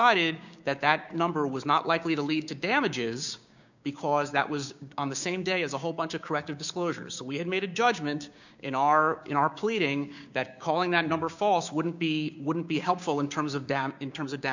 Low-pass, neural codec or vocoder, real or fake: 7.2 kHz; codec, 16 kHz, 6 kbps, DAC; fake